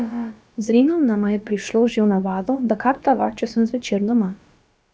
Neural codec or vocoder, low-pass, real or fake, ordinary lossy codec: codec, 16 kHz, about 1 kbps, DyCAST, with the encoder's durations; none; fake; none